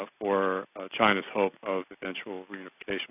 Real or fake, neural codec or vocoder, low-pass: real; none; 3.6 kHz